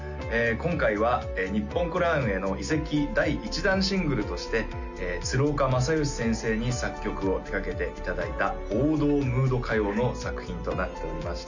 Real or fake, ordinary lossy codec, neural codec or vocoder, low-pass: real; none; none; 7.2 kHz